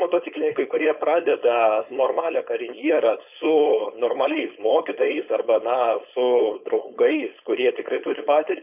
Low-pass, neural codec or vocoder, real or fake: 3.6 kHz; codec, 16 kHz, 4.8 kbps, FACodec; fake